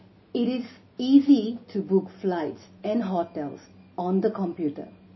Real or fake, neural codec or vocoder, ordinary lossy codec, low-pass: real; none; MP3, 24 kbps; 7.2 kHz